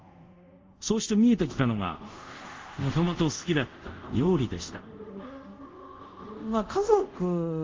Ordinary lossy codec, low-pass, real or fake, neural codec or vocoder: Opus, 32 kbps; 7.2 kHz; fake; codec, 24 kHz, 0.5 kbps, DualCodec